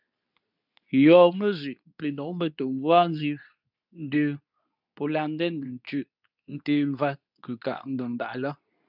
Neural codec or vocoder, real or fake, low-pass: codec, 24 kHz, 0.9 kbps, WavTokenizer, medium speech release version 2; fake; 5.4 kHz